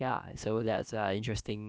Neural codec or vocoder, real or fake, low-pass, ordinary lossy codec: codec, 16 kHz, about 1 kbps, DyCAST, with the encoder's durations; fake; none; none